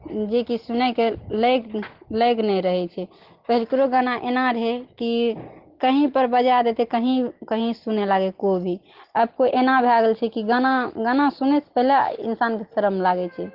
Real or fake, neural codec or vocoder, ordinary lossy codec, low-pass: real; none; Opus, 16 kbps; 5.4 kHz